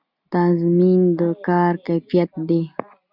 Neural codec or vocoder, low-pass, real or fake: none; 5.4 kHz; real